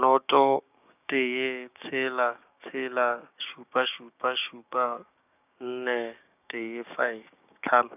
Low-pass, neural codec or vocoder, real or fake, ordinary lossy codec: 3.6 kHz; codec, 16 kHz, 6 kbps, DAC; fake; none